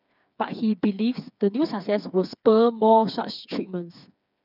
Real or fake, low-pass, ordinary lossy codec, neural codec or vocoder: fake; 5.4 kHz; none; codec, 16 kHz, 4 kbps, FreqCodec, smaller model